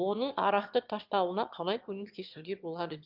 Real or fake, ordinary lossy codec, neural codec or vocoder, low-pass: fake; Opus, 24 kbps; autoencoder, 22.05 kHz, a latent of 192 numbers a frame, VITS, trained on one speaker; 5.4 kHz